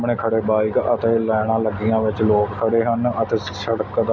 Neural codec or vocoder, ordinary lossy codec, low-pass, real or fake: none; none; none; real